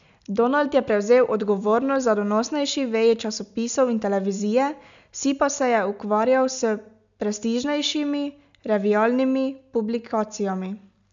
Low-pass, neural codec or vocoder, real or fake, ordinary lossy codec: 7.2 kHz; none; real; none